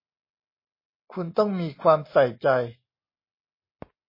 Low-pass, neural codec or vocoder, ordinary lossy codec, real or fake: 5.4 kHz; none; MP3, 24 kbps; real